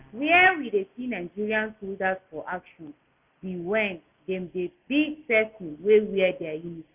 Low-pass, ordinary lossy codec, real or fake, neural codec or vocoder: 3.6 kHz; none; real; none